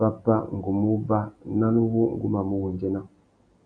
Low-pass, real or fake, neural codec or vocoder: 9.9 kHz; real; none